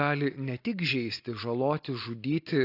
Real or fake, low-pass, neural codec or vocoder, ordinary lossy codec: real; 5.4 kHz; none; AAC, 32 kbps